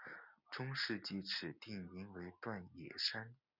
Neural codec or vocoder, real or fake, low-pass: none; real; 5.4 kHz